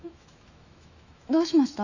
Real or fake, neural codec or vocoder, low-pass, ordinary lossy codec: fake; autoencoder, 48 kHz, 128 numbers a frame, DAC-VAE, trained on Japanese speech; 7.2 kHz; none